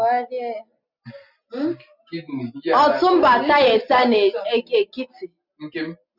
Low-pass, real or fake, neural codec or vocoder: 5.4 kHz; real; none